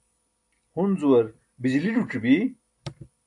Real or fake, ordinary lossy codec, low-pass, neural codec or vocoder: real; AAC, 64 kbps; 10.8 kHz; none